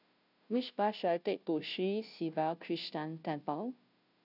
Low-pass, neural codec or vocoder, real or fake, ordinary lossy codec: 5.4 kHz; codec, 16 kHz, 0.5 kbps, FunCodec, trained on Chinese and English, 25 frames a second; fake; none